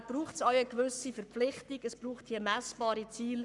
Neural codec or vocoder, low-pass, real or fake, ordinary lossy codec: codec, 44.1 kHz, 7.8 kbps, Pupu-Codec; 10.8 kHz; fake; none